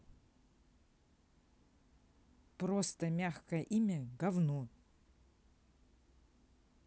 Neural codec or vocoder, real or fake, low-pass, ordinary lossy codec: none; real; none; none